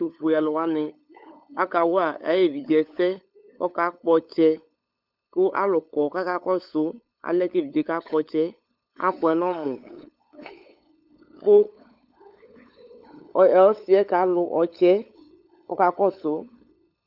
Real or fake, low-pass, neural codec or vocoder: fake; 5.4 kHz; codec, 16 kHz, 8 kbps, FunCodec, trained on LibriTTS, 25 frames a second